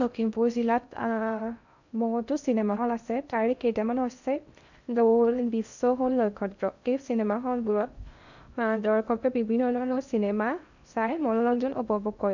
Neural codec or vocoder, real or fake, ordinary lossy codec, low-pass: codec, 16 kHz in and 24 kHz out, 0.6 kbps, FocalCodec, streaming, 2048 codes; fake; none; 7.2 kHz